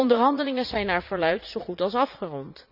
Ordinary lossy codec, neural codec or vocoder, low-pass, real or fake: none; vocoder, 44.1 kHz, 80 mel bands, Vocos; 5.4 kHz; fake